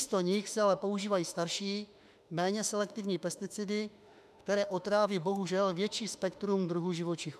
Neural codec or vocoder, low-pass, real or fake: autoencoder, 48 kHz, 32 numbers a frame, DAC-VAE, trained on Japanese speech; 14.4 kHz; fake